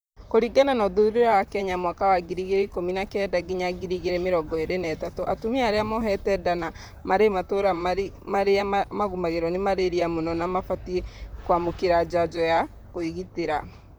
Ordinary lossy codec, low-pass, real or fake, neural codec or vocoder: none; none; fake; vocoder, 44.1 kHz, 128 mel bands, Pupu-Vocoder